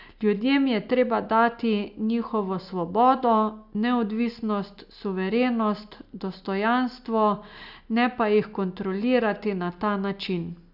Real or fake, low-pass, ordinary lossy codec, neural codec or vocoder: real; 5.4 kHz; none; none